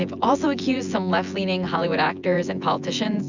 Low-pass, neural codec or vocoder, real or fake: 7.2 kHz; vocoder, 24 kHz, 100 mel bands, Vocos; fake